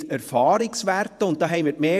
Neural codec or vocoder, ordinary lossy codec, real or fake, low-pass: vocoder, 48 kHz, 128 mel bands, Vocos; AAC, 96 kbps; fake; 14.4 kHz